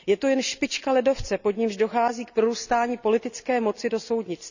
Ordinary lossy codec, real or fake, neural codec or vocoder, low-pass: none; real; none; 7.2 kHz